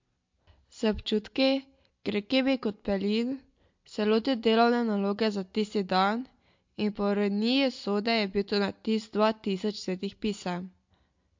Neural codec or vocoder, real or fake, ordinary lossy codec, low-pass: none; real; MP3, 48 kbps; 7.2 kHz